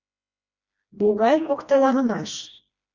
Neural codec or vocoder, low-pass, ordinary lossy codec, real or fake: codec, 16 kHz, 1 kbps, FreqCodec, smaller model; 7.2 kHz; Opus, 64 kbps; fake